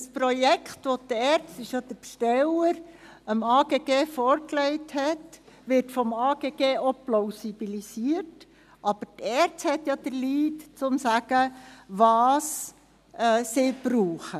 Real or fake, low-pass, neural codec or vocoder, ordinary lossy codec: real; 14.4 kHz; none; none